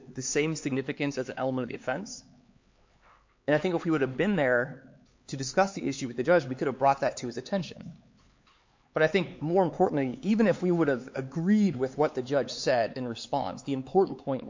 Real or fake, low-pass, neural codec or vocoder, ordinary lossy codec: fake; 7.2 kHz; codec, 16 kHz, 4 kbps, X-Codec, HuBERT features, trained on LibriSpeech; MP3, 48 kbps